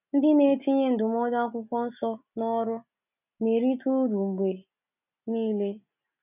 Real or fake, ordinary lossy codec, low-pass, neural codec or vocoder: real; none; 3.6 kHz; none